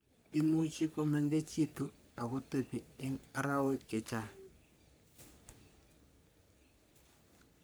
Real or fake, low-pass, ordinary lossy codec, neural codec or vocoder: fake; none; none; codec, 44.1 kHz, 3.4 kbps, Pupu-Codec